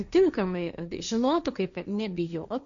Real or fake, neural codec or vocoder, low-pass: fake; codec, 16 kHz, 1.1 kbps, Voila-Tokenizer; 7.2 kHz